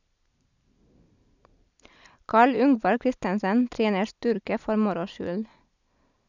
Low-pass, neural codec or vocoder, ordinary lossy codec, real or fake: 7.2 kHz; none; none; real